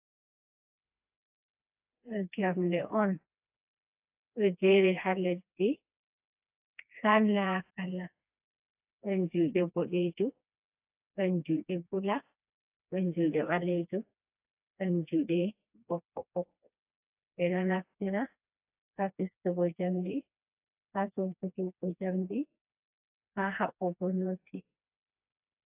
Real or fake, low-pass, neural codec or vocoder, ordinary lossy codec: fake; 3.6 kHz; codec, 16 kHz, 2 kbps, FreqCodec, smaller model; AAC, 32 kbps